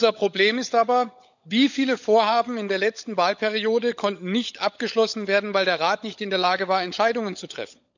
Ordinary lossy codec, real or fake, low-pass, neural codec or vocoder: none; fake; 7.2 kHz; codec, 16 kHz, 16 kbps, FunCodec, trained on LibriTTS, 50 frames a second